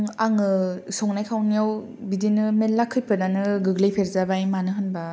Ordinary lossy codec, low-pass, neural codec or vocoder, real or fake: none; none; none; real